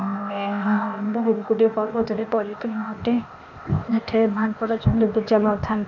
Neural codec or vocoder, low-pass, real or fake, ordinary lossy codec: codec, 16 kHz, 0.8 kbps, ZipCodec; 7.2 kHz; fake; none